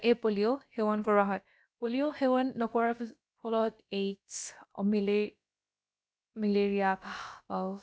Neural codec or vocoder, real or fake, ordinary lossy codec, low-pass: codec, 16 kHz, about 1 kbps, DyCAST, with the encoder's durations; fake; none; none